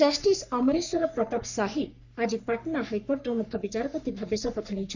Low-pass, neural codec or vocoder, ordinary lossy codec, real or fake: 7.2 kHz; codec, 44.1 kHz, 3.4 kbps, Pupu-Codec; none; fake